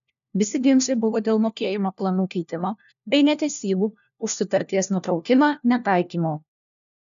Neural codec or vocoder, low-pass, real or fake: codec, 16 kHz, 1 kbps, FunCodec, trained on LibriTTS, 50 frames a second; 7.2 kHz; fake